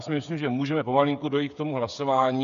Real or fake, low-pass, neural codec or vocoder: fake; 7.2 kHz; codec, 16 kHz, 8 kbps, FreqCodec, smaller model